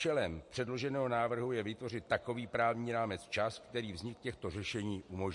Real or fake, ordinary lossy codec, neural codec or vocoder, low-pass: real; MP3, 48 kbps; none; 10.8 kHz